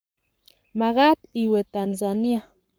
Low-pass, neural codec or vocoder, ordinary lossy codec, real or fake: none; codec, 44.1 kHz, 7.8 kbps, Pupu-Codec; none; fake